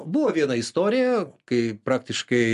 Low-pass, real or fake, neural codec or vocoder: 10.8 kHz; real; none